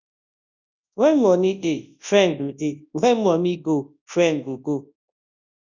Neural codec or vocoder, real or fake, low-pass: codec, 24 kHz, 0.9 kbps, WavTokenizer, large speech release; fake; 7.2 kHz